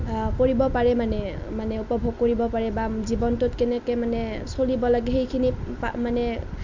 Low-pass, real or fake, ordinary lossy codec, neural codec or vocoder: 7.2 kHz; real; MP3, 64 kbps; none